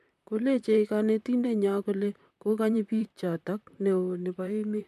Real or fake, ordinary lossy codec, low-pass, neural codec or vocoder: fake; MP3, 96 kbps; 14.4 kHz; vocoder, 44.1 kHz, 128 mel bands, Pupu-Vocoder